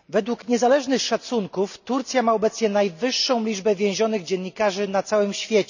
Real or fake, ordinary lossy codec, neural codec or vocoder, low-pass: real; none; none; 7.2 kHz